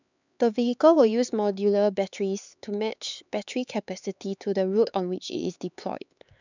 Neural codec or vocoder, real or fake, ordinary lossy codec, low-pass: codec, 16 kHz, 4 kbps, X-Codec, HuBERT features, trained on LibriSpeech; fake; none; 7.2 kHz